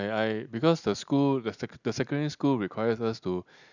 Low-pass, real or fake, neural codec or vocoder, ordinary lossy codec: 7.2 kHz; real; none; none